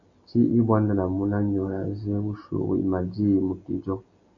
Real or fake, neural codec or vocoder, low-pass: real; none; 7.2 kHz